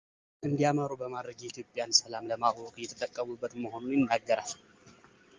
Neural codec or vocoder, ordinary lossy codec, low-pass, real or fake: none; Opus, 32 kbps; 7.2 kHz; real